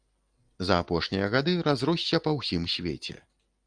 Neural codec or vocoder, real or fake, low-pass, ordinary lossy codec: none; real; 9.9 kHz; Opus, 32 kbps